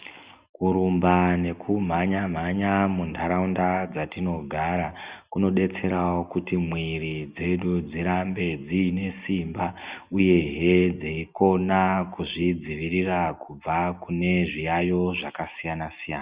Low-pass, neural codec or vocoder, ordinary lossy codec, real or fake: 3.6 kHz; none; Opus, 64 kbps; real